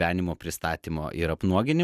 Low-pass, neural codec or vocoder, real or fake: 14.4 kHz; none; real